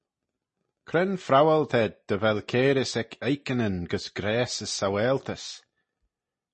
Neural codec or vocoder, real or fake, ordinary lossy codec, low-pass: none; real; MP3, 32 kbps; 10.8 kHz